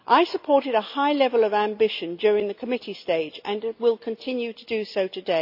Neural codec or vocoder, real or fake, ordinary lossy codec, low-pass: none; real; none; 5.4 kHz